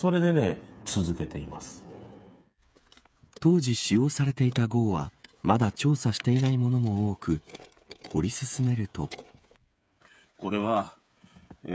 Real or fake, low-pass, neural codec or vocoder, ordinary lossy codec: fake; none; codec, 16 kHz, 8 kbps, FreqCodec, smaller model; none